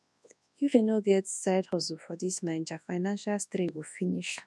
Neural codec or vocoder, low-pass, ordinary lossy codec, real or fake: codec, 24 kHz, 0.9 kbps, WavTokenizer, large speech release; none; none; fake